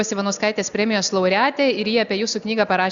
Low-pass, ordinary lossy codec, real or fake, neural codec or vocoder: 7.2 kHz; Opus, 64 kbps; real; none